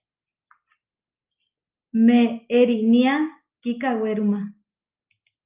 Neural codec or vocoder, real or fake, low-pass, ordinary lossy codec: none; real; 3.6 kHz; Opus, 24 kbps